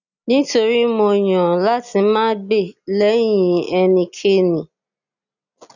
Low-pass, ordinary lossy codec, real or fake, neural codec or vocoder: 7.2 kHz; none; real; none